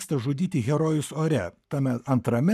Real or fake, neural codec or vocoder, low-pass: fake; codec, 44.1 kHz, 7.8 kbps, Pupu-Codec; 14.4 kHz